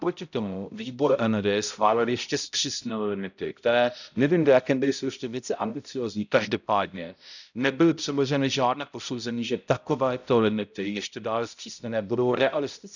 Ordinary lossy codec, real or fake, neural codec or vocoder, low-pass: none; fake; codec, 16 kHz, 0.5 kbps, X-Codec, HuBERT features, trained on balanced general audio; 7.2 kHz